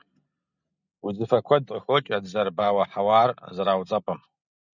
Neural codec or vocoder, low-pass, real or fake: none; 7.2 kHz; real